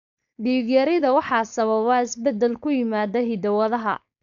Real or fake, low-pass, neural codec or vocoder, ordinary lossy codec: fake; 7.2 kHz; codec, 16 kHz, 4.8 kbps, FACodec; none